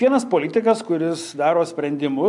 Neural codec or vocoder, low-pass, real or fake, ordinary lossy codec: none; 10.8 kHz; real; MP3, 64 kbps